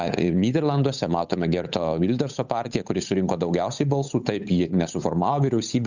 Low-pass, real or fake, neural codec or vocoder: 7.2 kHz; fake; codec, 16 kHz, 8 kbps, FunCodec, trained on Chinese and English, 25 frames a second